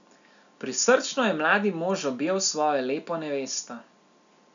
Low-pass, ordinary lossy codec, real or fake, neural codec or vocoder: 7.2 kHz; none; real; none